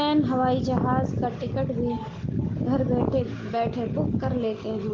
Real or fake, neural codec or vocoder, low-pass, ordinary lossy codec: real; none; 7.2 kHz; Opus, 16 kbps